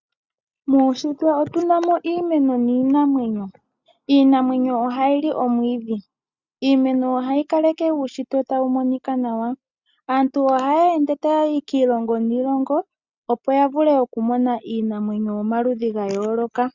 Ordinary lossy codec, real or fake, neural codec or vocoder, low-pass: Opus, 64 kbps; real; none; 7.2 kHz